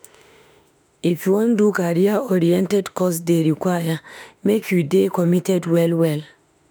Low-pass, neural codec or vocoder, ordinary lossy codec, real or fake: none; autoencoder, 48 kHz, 32 numbers a frame, DAC-VAE, trained on Japanese speech; none; fake